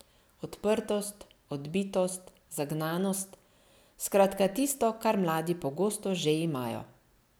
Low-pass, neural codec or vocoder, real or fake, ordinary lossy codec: none; none; real; none